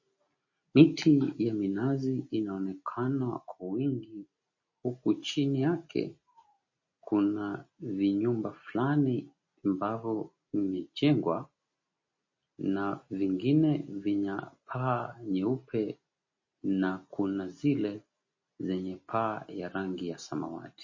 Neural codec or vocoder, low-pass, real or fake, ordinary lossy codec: none; 7.2 kHz; real; MP3, 32 kbps